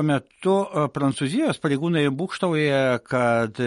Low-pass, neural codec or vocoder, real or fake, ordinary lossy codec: 14.4 kHz; none; real; MP3, 48 kbps